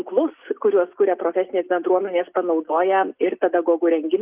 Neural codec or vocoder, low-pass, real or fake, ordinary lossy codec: none; 3.6 kHz; real; Opus, 24 kbps